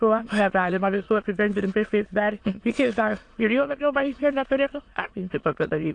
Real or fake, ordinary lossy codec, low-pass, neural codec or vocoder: fake; AAC, 48 kbps; 9.9 kHz; autoencoder, 22.05 kHz, a latent of 192 numbers a frame, VITS, trained on many speakers